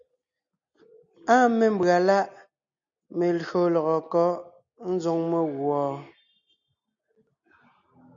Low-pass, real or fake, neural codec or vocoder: 7.2 kHz; real; none